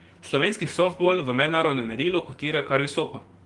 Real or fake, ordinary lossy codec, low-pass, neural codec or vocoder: fake; Opus, 24 kbps; 10.8 kHz; codec, 44.1 kHz, 2.6 kbps, SNAC